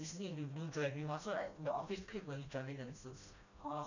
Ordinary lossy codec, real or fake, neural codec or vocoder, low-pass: none; fake; codec, 16 kHz, 1 kbps, FreqCodec, smaller model; 7.2 kHz